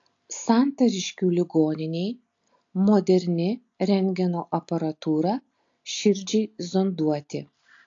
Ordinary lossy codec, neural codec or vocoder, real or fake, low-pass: AAC, 48 kbps; none; real; 7.2 kHz